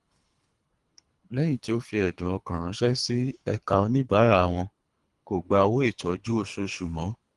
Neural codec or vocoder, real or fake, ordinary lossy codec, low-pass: codec, 24 kHz, 3 kbps, HILCodec; fake; Opus, 24 kbps; 10.8 kHz